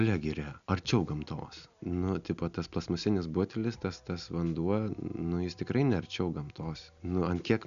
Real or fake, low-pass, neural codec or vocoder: real; 7.2 kHz; none